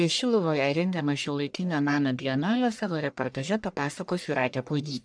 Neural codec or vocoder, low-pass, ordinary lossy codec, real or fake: codec, 44.1 kHz, 1.7 kbps, Pupu-Codec; 9.9 kHz; MP3, 96 kbps; fake